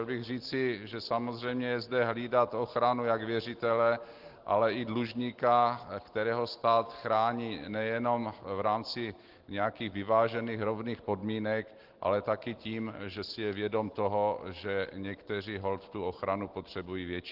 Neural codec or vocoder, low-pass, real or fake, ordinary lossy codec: none; 5.4 kHz; real; Opus, 16 kbps